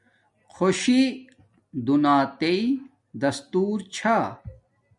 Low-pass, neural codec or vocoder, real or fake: 10.8 kHz; none; real